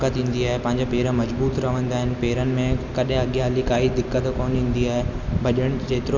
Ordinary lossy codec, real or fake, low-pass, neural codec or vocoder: none; real; 7.2 kHz; none